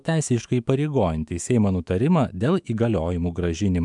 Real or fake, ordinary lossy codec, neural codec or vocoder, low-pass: fake; MP3, 96 kbps; autoencoder, 48 kHz, 128 numbers a frame, DAC-VAE, trained on Japanese speech; 10.8 kHz